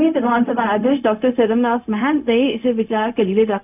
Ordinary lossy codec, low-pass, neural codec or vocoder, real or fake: none; 3.6 kHz; codec, 16 kHz, 0.4 kbps, LongCat-Audio-Codec; fake